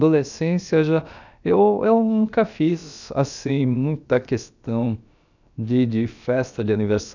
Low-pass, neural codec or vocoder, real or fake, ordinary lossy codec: 7.2 kHz; codec, 16 kHz, about 1 kbps, DyCAST, with the encoder's durations; fake; none